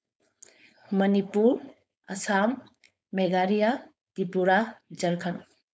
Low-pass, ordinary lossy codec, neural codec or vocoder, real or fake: none; none; codec, 16 kHz, 4.8 kbps, FACodec; fake